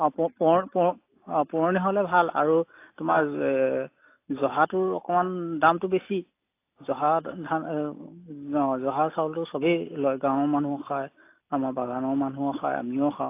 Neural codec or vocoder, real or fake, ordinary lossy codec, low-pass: none; real; AAC, 24 kbps; 3.6 kHz